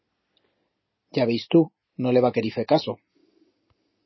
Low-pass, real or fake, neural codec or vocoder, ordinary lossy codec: 7.2 kHz; real; none; MP3, 24 kbps